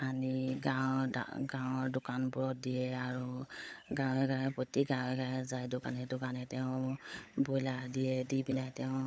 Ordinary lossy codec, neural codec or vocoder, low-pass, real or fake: none; codec, 16 kHz, 16 kbps, FunCodec, trained on LibriTTS, 50 frames a second; none; fake